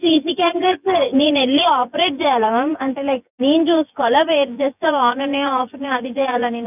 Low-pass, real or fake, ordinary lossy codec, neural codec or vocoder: 3.6 kHz; fake; none; vocoder, 24 kHz, 100 mel bands, Vocos